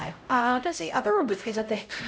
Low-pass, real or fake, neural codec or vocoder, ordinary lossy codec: none; fake; codec, 16 kHz, 0.5 kbps, X-Codec, HuBERT features, trained on LibriSpeech; none